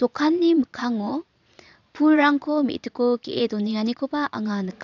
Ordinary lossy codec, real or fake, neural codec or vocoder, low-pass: none; fake; vocoder, 22.05 kHz, 80 mel bands, Vocos; 7.2 kHz